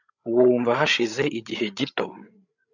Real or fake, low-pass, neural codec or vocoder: fake; 7.2 kHz; codec, 16 kHz, 16 kbps, FreqCodec, larger model